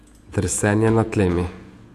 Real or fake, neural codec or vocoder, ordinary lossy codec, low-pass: fake; vocoder, 48 kHz, 128 mel bands, Vocos; AAC, 64 kbps; 14.4 kHz